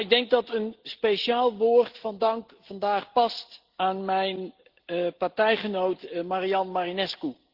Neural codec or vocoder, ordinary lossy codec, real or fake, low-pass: none; Opus, 16 kbps; real; 5.4 kHz